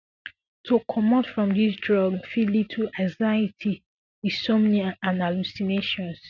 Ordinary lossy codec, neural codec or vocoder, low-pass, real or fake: none; none; 7.2 kHz; real